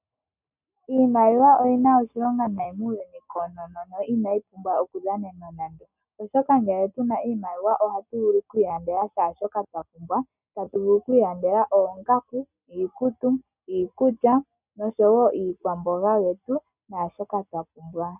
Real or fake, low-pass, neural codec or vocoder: real; 3.6 kHz; none